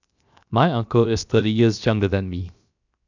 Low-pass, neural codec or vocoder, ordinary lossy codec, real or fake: 7.2 kHz; codec, 16 kHz, 0.7 kbps, FocalCodec; none; fake